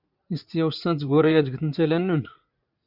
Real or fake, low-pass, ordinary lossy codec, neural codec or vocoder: fake; 5.4 kHz; Opus, 64 kbps; vocoder, 44.1 kHz, 80 mel bands, Vocos